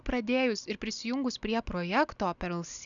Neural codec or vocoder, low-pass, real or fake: none; 7.2 kHz; real